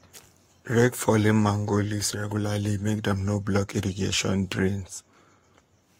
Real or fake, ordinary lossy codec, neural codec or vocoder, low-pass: fake; AAC, 48 kbps; codec, 44.1 kHz, 7.8 kbps, Pupu-Codec; 19.8 kHz